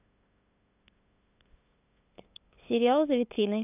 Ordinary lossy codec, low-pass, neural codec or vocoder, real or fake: none; 3.6 kHz; codec, 16 kHz, 8 kbps, FunCodec, trained on LibriTTS, 25 frames a second; fake